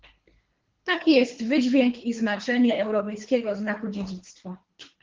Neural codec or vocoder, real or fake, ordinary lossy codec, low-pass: codec, 24 kHz, 3 kbps, HILCodec; fake; Opus, 16 kbps; 7.2 kHz